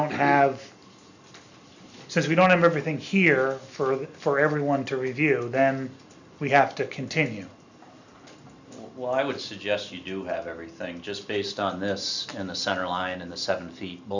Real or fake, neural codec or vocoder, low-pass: real; none; 7.2 kHz